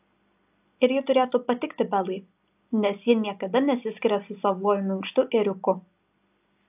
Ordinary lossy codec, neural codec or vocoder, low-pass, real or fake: AAC, 32 kbps; none; 3.6 kHz; real